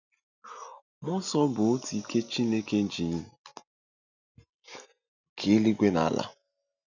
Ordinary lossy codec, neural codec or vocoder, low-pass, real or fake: none; none; 7.2 kHz; real